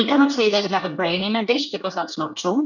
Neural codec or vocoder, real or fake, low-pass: codec, 24 kHz, 1 kbps, SNAC; fake; 7.2 kHz